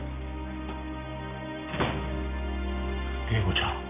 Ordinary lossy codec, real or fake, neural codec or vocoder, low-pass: none; real; none; 3.6 kHz